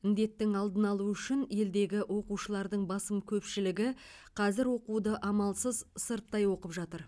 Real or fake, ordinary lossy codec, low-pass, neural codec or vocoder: real; none; none; none